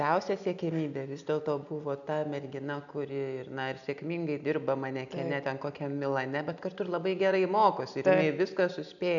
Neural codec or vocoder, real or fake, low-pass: none; real; 7.2 kHz